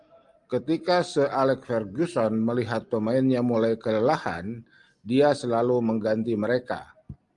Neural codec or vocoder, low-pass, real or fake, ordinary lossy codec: none; 10.8 kHz; real; Opus, 32 kbps